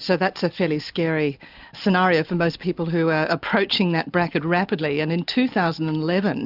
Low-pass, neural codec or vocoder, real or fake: 5.4 kHz; none; real